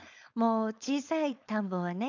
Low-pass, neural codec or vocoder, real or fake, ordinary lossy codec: 7.2 kHz; codec, 16 kHz, 4.8 kbps, FACodec; fake; none